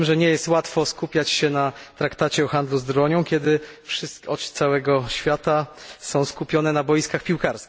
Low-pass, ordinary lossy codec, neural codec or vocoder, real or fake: none; none; none; real